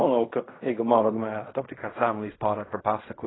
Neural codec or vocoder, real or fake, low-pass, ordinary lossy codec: codec, 16 kHz in and 24 kHz out, 0.4 kbps, LongCat-Audio-Codec, fine tuned four codebook decoder; fake; 7.2 kHz; AAC, 16 kbps